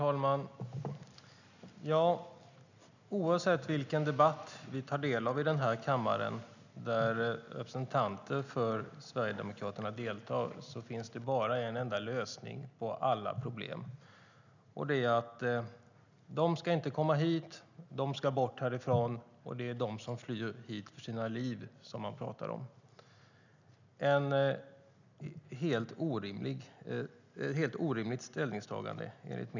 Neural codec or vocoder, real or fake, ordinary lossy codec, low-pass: none; real; none; 7.2 kHz